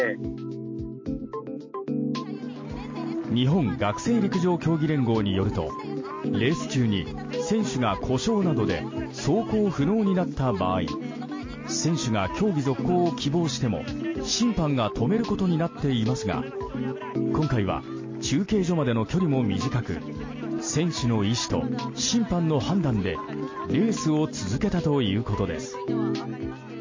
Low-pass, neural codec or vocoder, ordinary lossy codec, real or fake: 7.2 kHz; none; MP3, 32 kbps; real